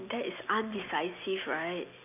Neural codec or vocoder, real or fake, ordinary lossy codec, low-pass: none; real; none; 3.6 kHz